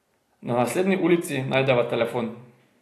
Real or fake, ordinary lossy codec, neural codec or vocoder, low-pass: real; AAC, 48 kbps; none; 14.4 kHz